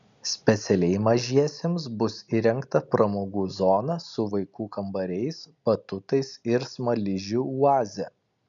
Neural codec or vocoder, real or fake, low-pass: none; real; 7.2 kHz